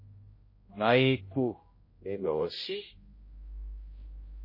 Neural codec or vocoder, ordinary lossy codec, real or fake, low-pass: codec, 16 kHz, 0.5 kbps, X-Codec, HuBERT features, trained on general audio; MP3, 24 kbps; fake; 5.4 kHz